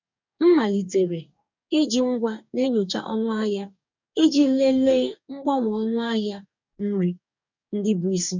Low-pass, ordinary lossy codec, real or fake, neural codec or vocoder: 7.2 kHz; none; fake; codec, 44.1 kHz, 2.6 kbps, DAC